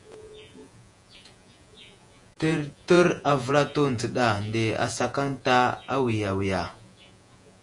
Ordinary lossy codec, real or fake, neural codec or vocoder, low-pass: MP3, 64 kbps; fake; vocoder, 48 kHz, 128 mel bands, Vocos; 10.8 kHz